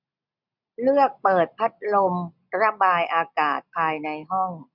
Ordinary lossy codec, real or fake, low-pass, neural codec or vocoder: none; real; 5.4 kHz; none